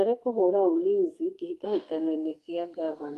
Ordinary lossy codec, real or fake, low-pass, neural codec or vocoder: Opus, 32 kbps; fake; 14.4 kHz; codec, 32 kHz, 1.9 kbps, SNAC